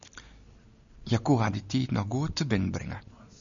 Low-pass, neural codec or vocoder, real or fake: 7.2 kHz; none; real